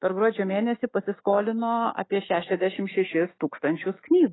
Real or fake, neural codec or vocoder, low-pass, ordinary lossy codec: fake; autoencoder, 48 kHz, 128 numbers a frame, DAC-VAE, trained on Japanese speech; 7.2 kHz; AAC, 16 kbps